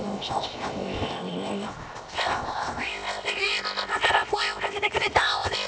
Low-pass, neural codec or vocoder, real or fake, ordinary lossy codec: none; codec, 16 kHz, 0.7 kbps, FocalCodec; fake; none